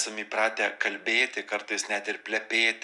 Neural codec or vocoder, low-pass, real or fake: none; 10.8 kHz; real